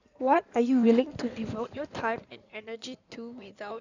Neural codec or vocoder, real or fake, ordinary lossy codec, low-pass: codec, 16 kHz in and 24 kHz out, 2.2 kbps, FireRedTTS-2 codec; fake; none; 7.2 kHz